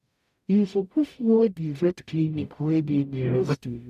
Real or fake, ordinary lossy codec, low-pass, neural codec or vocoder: fake; none; 14.4 kHz; codec, 44.1 kHz, 0.9 kbps, DAC